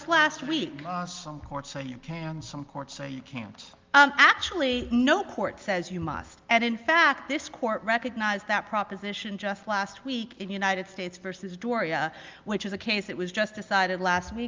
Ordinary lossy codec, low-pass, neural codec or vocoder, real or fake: Opus, 32 kbps; 7.2 kHz; none; real